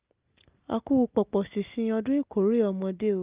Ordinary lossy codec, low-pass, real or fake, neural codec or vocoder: Opus, 32 kbps; 3.6 kHz; real; none